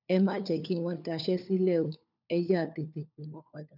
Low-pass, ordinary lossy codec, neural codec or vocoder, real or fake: 5.4 kHz; none; codec, 16 kHz, 4 kbps, FunCodec, trained on LibriTTS, 50 frames a second; fake